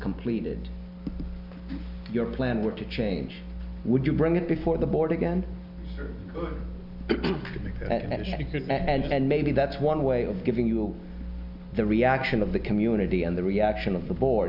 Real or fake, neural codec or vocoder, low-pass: real; none; 5.4 kHz